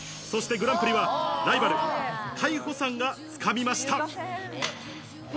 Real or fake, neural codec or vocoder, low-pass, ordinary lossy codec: real; none; none; none